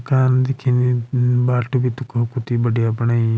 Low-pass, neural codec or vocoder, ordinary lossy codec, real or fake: none; none; none; real